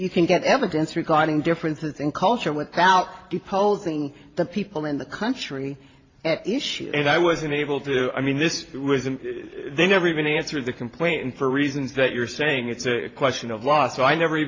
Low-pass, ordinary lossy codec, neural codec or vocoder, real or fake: 7.2 kHz; AAC, 32 kbps; vocoder, 44.1 kHz, 128 mel bands every 512 samples, BigVGAN v2; fake